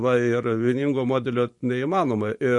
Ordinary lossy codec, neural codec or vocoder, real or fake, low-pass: MP3, 48 kbps; vocoder, 44.1 kHz, 128 mel bands every 512 samples, BigVGAN v2; fake; 10.8 kHz